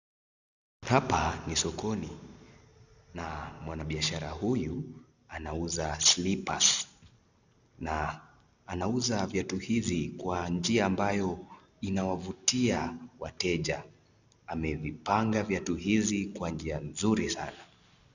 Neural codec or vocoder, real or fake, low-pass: none; real; 7.2 kHz